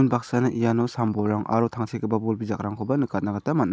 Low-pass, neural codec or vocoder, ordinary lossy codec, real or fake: none; none; none; real